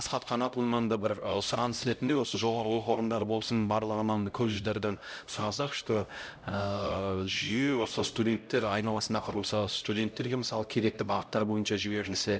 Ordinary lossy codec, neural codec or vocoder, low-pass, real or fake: none; codec, 16 kHz, 0.5 kbps, X-Codec, HuBERT features, trained on LibriSpeech; none; fake